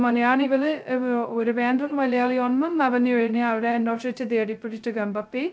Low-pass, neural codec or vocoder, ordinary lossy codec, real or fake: none; codec, 16 kHz, 0.2 kbps, FocalCodec; none; fake